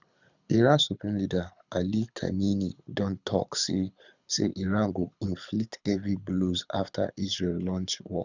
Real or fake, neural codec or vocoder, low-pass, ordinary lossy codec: fake; codec, 24 kHz, 6 kbps, HILCodec; 7.2 kHz; none